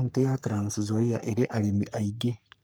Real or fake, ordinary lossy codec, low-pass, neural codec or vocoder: fake; none; none; codec, 44.1 kHz, 3.4 kbps, Pupu-Codec